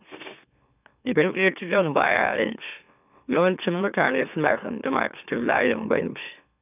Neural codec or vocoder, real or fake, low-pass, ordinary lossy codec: autoencoder, 44.1 kHz, a latent of 192 numbers a frame, MeloTTS; fake; 3.6 kHz; none